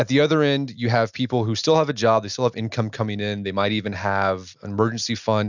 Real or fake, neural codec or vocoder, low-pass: real; none; 7.2 kHz